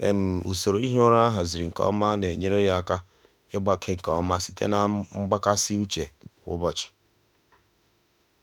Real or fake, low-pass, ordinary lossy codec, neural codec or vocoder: fake; 19.8 kHz; none; autoencoder, 48 kHz, 32 numbers a frame, DAC-VAE, trained on Japanese speech